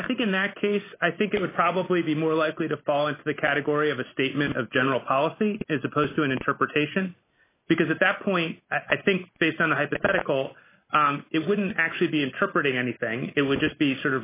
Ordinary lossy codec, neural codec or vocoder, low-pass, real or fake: AAC, 24 kbps; none; 3.6 kHz; real